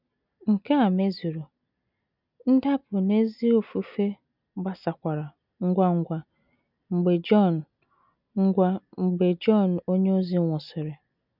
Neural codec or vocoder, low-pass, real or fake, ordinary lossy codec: none; 5.4 kHz; real; none